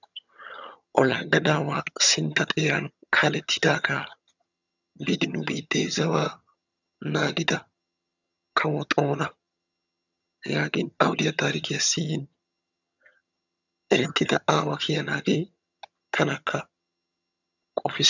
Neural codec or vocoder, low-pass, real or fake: vocoder, 22.05 kHz, 80 mel bands, HiFi-GAN; 7.2 kHz; fake